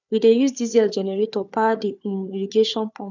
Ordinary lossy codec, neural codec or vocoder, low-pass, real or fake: none; codec, 16 kHz, 4 kbps, FunCodec, trained on Chinese and English, 50 frames a second; 7.2 kHz; fake